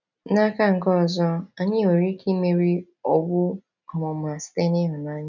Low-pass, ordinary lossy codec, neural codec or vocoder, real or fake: 7.2 kHz; none; none; real